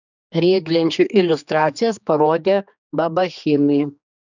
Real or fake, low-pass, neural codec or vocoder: fake; 7.2 kHz; codec, 16 kHz, 2 kbps, X-Codec, HuBERT features, trained on general audio